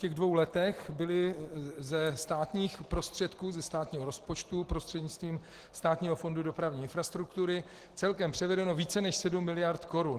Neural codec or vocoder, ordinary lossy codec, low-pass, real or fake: none; Opus, 16 kbps; 14.4 kHz; real